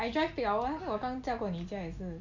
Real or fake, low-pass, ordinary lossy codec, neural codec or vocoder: real; 7.2 kHz; none; none